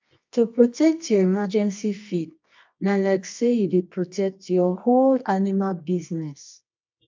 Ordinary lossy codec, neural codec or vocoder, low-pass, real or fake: MP3, 64 kbps; codec, 24 kHz, 0.9 kbps, WavTokenizer, medium music audio release; 7.2 kHz; fake